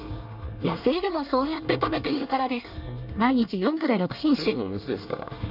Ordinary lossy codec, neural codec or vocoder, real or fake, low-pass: none; codec, 24 kHz, 1 kbps, SNAC; fake; 5.4 kHz